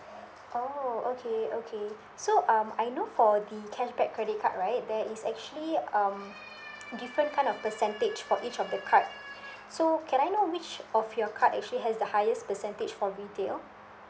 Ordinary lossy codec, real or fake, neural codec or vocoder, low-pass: none; real; none; none